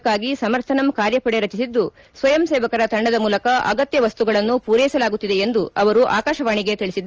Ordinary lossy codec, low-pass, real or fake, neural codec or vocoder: Opus, 24 kbps; 7.2 kHz; real; none